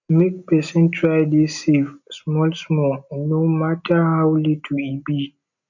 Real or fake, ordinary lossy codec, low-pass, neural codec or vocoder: real; none; 7.2 kHz; none